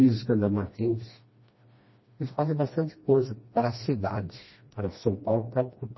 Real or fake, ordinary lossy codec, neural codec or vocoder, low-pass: fake; MP3, 24 kbps; codec, 16 kHz, 1 kbps, FreqCodec, smaller model; 7.2 kHz